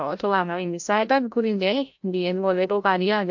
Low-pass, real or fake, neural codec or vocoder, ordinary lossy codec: 7.2 kHz; fake; codec, 16 kHz, 0.5 kbps, FreqCodec, larger model; MP3, 48 kbps